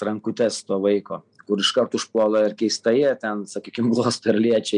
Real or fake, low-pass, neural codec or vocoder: real; 9.9 kHz; none